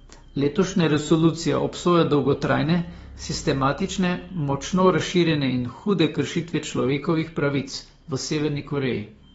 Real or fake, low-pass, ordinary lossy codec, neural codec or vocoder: fake; 19.8 kHz; AAC, 24 kbps; codec, 44.1 kHz, 7.8 kbps, DAC